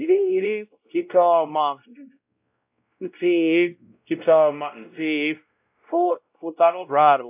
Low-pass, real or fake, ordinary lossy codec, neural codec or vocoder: 3.6 kHz; fake; AAC, 32 kbps; codec, 16 kHz, 0.5 kbps, X-Codec, WavLM features, trained on Multilingual LibriSpeech